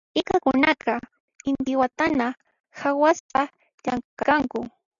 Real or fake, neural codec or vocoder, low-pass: real; none; 7.2 kHz